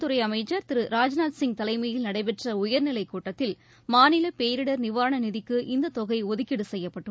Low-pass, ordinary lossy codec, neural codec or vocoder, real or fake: none; none; none; real